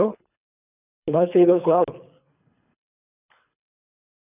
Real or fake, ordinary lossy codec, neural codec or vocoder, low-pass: fake; none; codec, 24 kHz, 3 kbps, HILCodec; 3.6 kHz